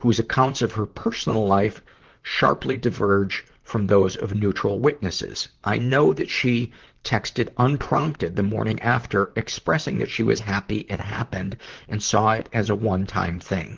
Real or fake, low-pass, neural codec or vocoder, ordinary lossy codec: fake; 7.2 kHz; vocoder, 44.1 kHz, 128 mel bands, Pupu-Vocoder; Opus, 16 kbps